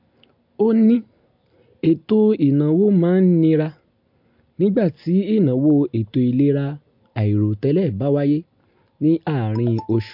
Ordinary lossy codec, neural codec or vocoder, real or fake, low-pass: MP3, 48 kbps; none; real; 5.4 kHz